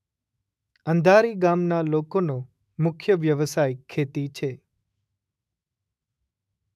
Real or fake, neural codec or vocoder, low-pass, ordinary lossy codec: fake; autoencoder, 48 kHz, 128 numbers a frame, DAC-VAE, trained on Japanese speech; 14.4 kHz; none